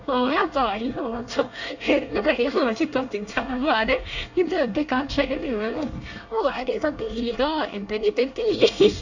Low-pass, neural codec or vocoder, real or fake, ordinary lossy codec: 7.2 kHz; codec, 24 kHz, 1 kbps, SNAC; fake; none